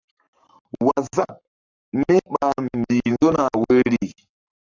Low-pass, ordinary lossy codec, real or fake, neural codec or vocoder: 7.2 kHz; AAC, 48 kbps; fake; codec, 44.1 kHz, 7.8 kbps, Pupu-Codec